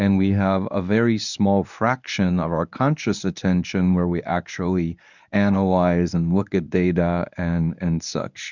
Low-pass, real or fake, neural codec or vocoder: 7.2 kHz; fake; codec, 24 kHz, 0.9 kbps, WavTokenizer, medium speech release version 1